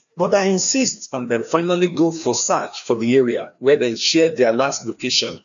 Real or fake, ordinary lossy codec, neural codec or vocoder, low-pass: fake; none; codec, 16 kHz, 1 kbps, FreqCodec, larger model; 7.2 kHz